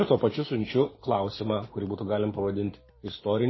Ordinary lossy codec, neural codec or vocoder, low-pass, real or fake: MP3, 24 kbps; none; 7.2 kHz; real